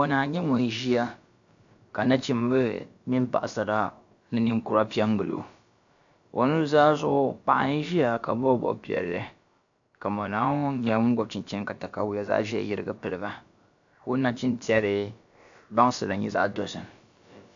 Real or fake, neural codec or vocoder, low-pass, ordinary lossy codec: fake; codec, 16 kHz, about 1 kbps, DyCAST, with the encoder's durations; 7.2 kHz; AAC, 64 kbps